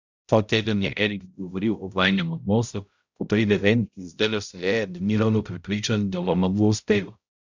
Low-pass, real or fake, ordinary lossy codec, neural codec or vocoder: 7.2 kHz; fake; Opus, 64 kbps; codec, 16 kHz, 0.5 kbps, X-Codec, HuBERT features, trained on balanced general audio